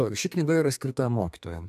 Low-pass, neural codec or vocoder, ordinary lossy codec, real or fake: 14.4 kHz; codec, 32 kHz, 1.9 kbps, SNAC; AAC, 96 kbps; fake